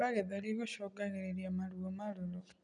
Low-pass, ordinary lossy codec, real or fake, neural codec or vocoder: 9.9 kHz; none; real; none